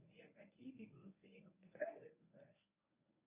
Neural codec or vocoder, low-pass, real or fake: codec, 24 kHz, 0.9 kbps, WavTokenizer, medium speech release version 1; 3.6 kHz; fake